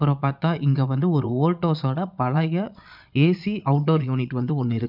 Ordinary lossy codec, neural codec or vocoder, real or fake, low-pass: none; vocoder, 22.05 kHz, 80 mel bands, Vocos; fake; 5.4 kHz